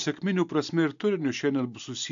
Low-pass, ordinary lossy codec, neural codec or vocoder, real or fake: 7.2 kHz; AAC, 64 kbps; none; real